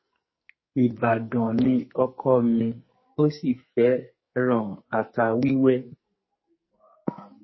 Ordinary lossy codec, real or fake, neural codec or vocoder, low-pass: MP3, 24 kbps; fake; codec, 44.1 kHz, 2.6 kbps, SNAC; 7.2 kHz